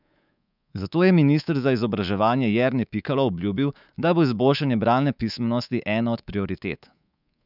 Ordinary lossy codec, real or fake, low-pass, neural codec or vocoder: none; fake; 5.4 kHz; codec, 24 kHz, 3.1 kbps, DualCodec